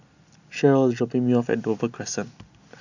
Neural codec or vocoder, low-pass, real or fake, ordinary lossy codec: none; 7.2 kHz; real; none